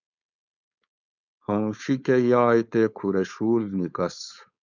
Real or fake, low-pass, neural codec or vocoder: fake; 7.2 kHz; codec, 16 kHz, 4.8 kbps, FACodec